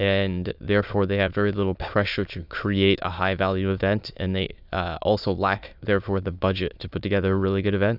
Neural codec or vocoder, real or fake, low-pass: autoencoder, 22.05 kHz, a latent of 192 numbers a frame, VITS, trained on many speakers; fake; 5.4 kHz